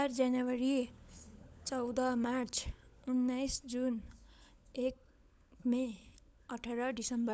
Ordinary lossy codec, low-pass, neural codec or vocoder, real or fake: none; none; codec, 16 kHz, 16 kbps, FunCodec, trained on LibriTTS, 50 frames a second; fake